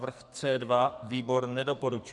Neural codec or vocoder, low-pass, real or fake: codec, 44.1 kHz, 2.6 kbps, SNAC; 10.8 kHz; fake